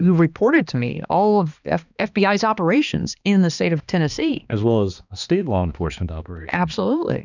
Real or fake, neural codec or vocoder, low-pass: fake; codec, 16 kHz, 2 kbps, X-Codec, HuBERT features, trained on balanced general audio; 7.2 kHz